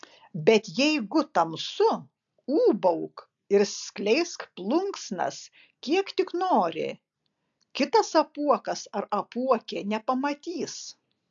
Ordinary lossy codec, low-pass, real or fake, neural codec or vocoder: AAC, 64 kbps; 7.2 kHz; real; none